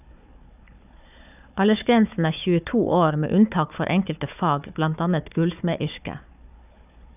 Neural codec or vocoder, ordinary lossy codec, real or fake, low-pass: codec, 16 kHz, 4 kbps, FunCodec, trained on Chinese and English, 50 frames a second; none; fake; 3.6 kHz